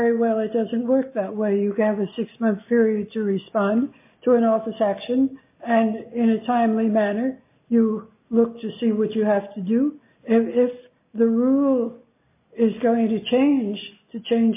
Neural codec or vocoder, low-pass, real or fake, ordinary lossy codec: none; 3.6 kHz; real; MP3, 24 kbps